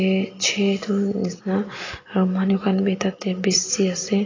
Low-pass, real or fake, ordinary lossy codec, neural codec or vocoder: 7.2 kHz; real; AAC, 32 kbps; none